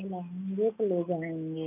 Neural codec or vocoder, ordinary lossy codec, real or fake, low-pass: none; none; real; 3.6 kHz